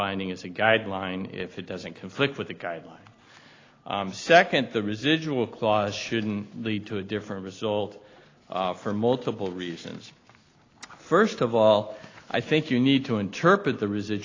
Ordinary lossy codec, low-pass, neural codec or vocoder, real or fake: AAC, 32 kbps; 7.2 kHz; none; real